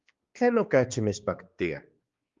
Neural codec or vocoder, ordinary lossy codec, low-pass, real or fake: codec, 16 kHz, 2 kbps, X-Codec, HuBERT features, trained on balanced general audio; Opus, 32 kbps; 7.2 kHz; fake